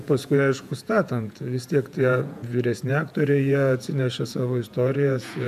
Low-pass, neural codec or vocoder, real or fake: 14.4 kHz; vocoder, 48 kHz, 128 mel bands, Vocos; fake